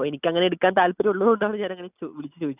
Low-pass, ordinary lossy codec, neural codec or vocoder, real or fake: 3.6 kHz; none; none; real